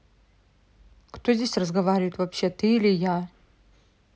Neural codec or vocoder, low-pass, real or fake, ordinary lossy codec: none; none; real; none